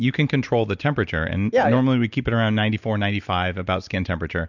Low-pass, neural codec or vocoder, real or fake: 7.2 kHz; none; real